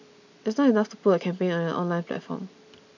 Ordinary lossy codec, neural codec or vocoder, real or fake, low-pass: none; none; real; 7.2 kHz